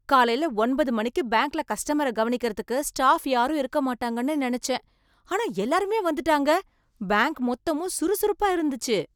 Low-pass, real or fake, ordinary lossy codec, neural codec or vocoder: none; real; none; none